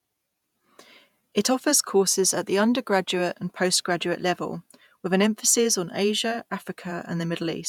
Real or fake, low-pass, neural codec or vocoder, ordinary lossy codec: fake; 19.8 kHz; vocoder, 48 kHz, 128 mel bands, Vocos; none